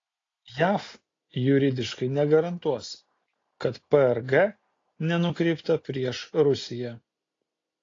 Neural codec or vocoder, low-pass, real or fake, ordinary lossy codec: none; 7.2 kHz; real; AAC, 32 kbps